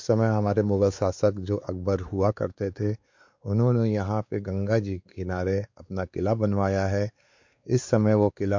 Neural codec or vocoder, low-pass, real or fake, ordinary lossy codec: codec, 16 kHz, 4 kbps, X-Codec, WavLM features, trained on Multilingual LibriSpeech; 7.2 kHz; fake; MP3, 48 kbps